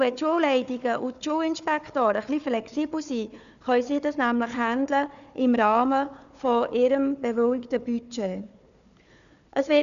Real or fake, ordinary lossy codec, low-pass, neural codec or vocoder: fake; none; 7.2 kHz; codec, 16 kHz, 4 kbps, FunCodec, trained on Chinese and English, 50 frames a second